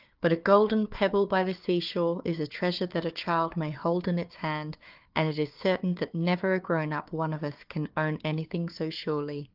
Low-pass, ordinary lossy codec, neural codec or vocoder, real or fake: 5.4 kHz; Opus, 32 kbps; codec, 16 kHz, 4 kbps, FunCodec, trained on Chinese and English, 50 frames a second; fake